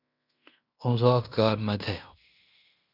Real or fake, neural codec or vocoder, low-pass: fake; codec, 16 kHz in and 24 kHz out, 0.9 kbps, LongCat-Audio-Codec, fine tuned four codebook decoder; 5.4 kHz